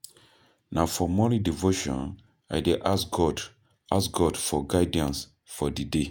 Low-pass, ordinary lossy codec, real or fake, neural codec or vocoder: none; none; fake; vocoder, 48 kHz, 128 mel bands, Vocos